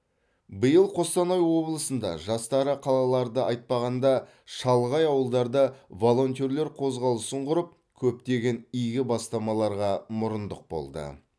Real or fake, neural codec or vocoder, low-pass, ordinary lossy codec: real; none; none; none